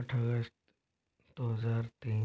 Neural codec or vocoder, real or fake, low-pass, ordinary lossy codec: none; real; none; none